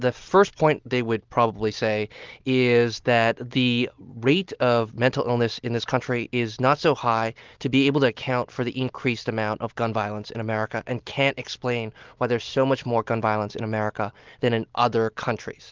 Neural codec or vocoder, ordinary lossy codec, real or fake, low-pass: none; Opus, 32 kbps; real; 7.2 kHz